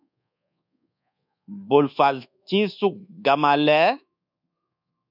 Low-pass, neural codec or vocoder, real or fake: 5.4 kHz; codec, 24 kHz, 1.2 kbps, DualCodec; fake